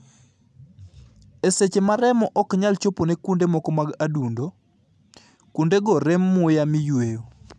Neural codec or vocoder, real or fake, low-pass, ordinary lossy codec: none; real; none; none